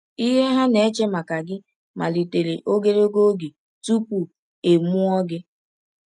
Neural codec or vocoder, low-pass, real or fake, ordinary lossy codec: none; 10.8 kHz; real; none